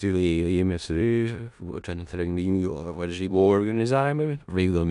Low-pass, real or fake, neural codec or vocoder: 10.8 kHz; fake; codec, 16 kHz in and 24 kHz out, 0.4 kbps, LongCat-Audio-Codec, four codebook decoder